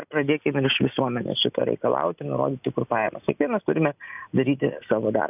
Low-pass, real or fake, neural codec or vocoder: 3.6 kHz; real; none